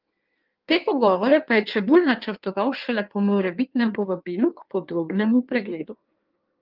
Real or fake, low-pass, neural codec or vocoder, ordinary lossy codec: fake; 5.4 kHz; codec, 16 kHz in and 24 kHz out, 1.1 kbps, FireRedTTS-2 codec; Opus, 32 kbps